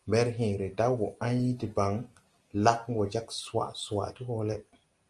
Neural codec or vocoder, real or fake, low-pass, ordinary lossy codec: none; real; 10.8 kHz; Opus, 32 kbps